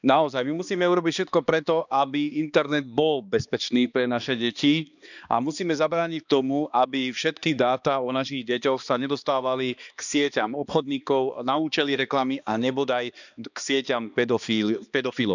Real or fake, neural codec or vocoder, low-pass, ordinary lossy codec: fake; codec, 16 kHz, 2 kbps, X-Codec, HuBERT features, trained on balanced general audio; 7.2 kHz; none